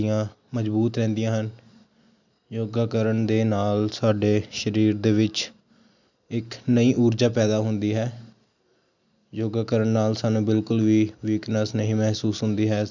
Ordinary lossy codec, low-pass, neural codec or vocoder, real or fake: none; 7.2 kHz; none; real